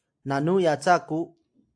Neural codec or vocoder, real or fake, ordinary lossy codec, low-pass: none; real; AAC, 64 kbps; 9.9 kHz